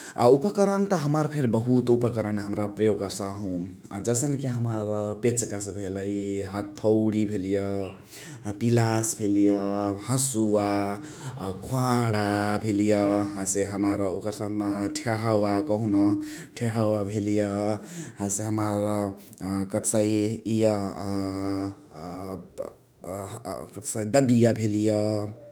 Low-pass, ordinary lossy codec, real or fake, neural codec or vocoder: none; none; fake; autoencoder, 48 kHz, 32 numbers a frame, DAC-VAE, trained on Japanese speech